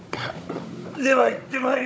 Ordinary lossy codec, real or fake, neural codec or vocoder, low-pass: none; fake; codec, 16 kHz, 16 kbps, FunCodec, trained on Chinese and English, 50 frames a second; none